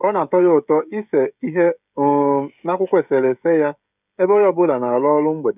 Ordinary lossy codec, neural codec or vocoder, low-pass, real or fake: none; codec, 16 kHz, 16 kbps, FreqCodec, smaller model; 3.6 kHz; fake